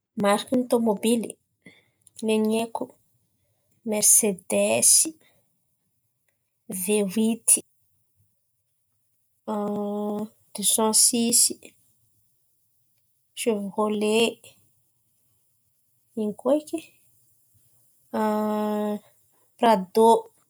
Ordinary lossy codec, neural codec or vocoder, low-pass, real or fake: none; none; none; real